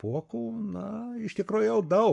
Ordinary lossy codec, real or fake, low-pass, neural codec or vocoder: MP3, 64 kbps; fake; 10.8 kHz; codec, 44.1 kHz, 7.8 kbps, Pupu-Codec